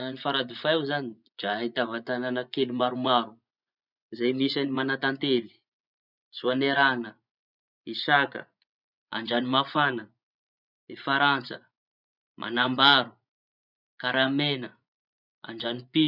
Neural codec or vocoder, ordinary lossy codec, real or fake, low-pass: vocoder, 24 kHz, 100 mel bands, Vocos; none; fake; 5.4 kHz